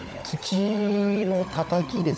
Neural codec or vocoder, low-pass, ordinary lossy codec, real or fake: codec, 16 kHz, 16 kbps, FunCodec, trained on LibriTTS, 50 frames a second; none; none; fake